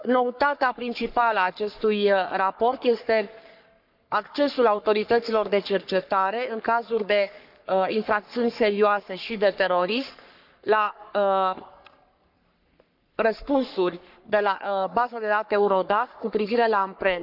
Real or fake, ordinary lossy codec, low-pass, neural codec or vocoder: fake; none; 5.4 kHz; codec, 44.1 kHz, 3.4 kbps, Pupu-Codec